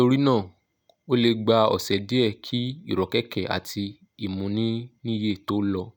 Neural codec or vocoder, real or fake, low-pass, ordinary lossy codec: none; real; 19.8 kHz; none